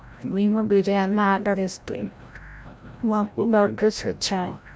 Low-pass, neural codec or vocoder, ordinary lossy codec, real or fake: none; codec, 16 kHz, 0.5 kbps, FreqCodec, larger model; none; fake